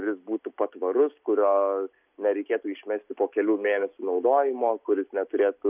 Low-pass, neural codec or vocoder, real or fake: 3.6 kHz; none; real